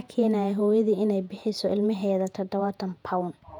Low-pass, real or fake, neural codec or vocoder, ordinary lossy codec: 19.8 kHz; fake; vocoder, 48 kHz, 128 mel bands, Vocos; none